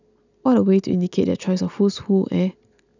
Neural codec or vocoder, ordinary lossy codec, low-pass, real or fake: none; none; 7.2 kHz; real